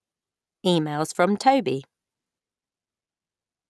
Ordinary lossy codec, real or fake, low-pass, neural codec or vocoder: none; real; none; none